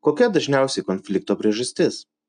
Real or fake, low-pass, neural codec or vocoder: real; 9.9 kHz; none